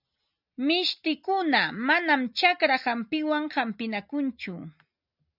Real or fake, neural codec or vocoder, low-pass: real; none; 5.4 kHz